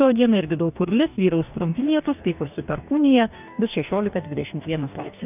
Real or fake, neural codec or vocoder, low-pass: fake; codec, 44.1 kHz, 2.6 kbps, DAC; 3.6 kHz